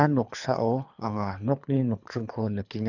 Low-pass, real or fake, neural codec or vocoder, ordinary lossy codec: 7.2 kHz; fake; codec, 24 kHz, 3 kbps, HILCodec; none